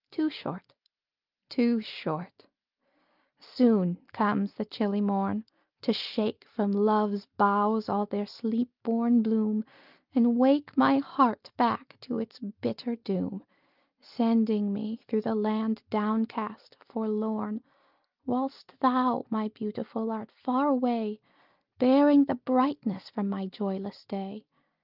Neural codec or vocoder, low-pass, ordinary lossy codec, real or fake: none; 5.4 kHz; Opus, 24 kbps; real